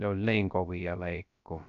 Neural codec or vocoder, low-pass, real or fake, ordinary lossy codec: codec, 16 kHz, 0.3 kbps, FocalCodec; 7.2 kHz; fake; none